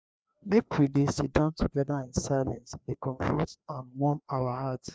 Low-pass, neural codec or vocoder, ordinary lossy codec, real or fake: none; codec, 16 kHz, 2 kbps, FreqCodec, larger model; none; fake